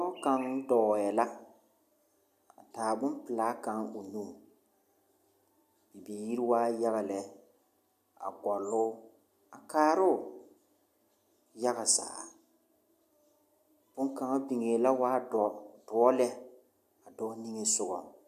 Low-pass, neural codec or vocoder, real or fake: 14.4 kHz; none; real